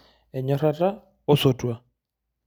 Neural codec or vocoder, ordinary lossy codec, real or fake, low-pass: none; none; real; none